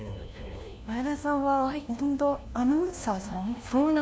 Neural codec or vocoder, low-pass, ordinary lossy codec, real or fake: codec, 16 kHz, 1 kbps, FunCodec, trained on LibriTTS, 50 frames a second; none; none; fake